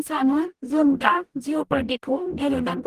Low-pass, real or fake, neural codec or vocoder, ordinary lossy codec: 14.4 kHz; fake; codec, 44.1 kHz, 0.9 kbps, DAC; Opus, 24 kbps